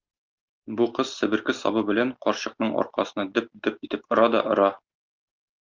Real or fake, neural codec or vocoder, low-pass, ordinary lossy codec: real; none; 7.2 kHz; Opus, 24 kbps